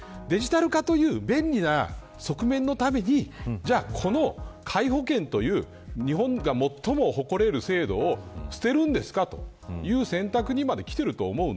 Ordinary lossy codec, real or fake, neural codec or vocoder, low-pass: none; real; none; none